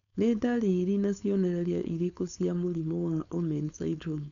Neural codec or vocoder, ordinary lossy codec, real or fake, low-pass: codec, 16 kHz, 4.8 kbps, FACodec; none; fake; 7.2 kHz